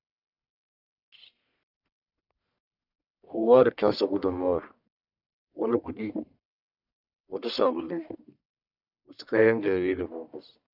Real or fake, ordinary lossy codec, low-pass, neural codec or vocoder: fake; none; 5.4 kHz; codec, 44.1 kHz, 1.7 kbps, Pupu-Codec